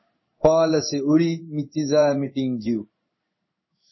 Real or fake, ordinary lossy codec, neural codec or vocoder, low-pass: fake; MP3, 24 kbps; codec, 16 kHz in and 24 kHz out, 1 kbps, XY-Tokenizer; 7.2 kHz